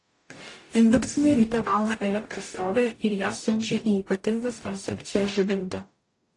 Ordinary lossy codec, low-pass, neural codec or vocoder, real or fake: AAC, 48 kbps; 10.8 kHz; codec, 44.1 kHz, 0.9 kbps, DAC; fake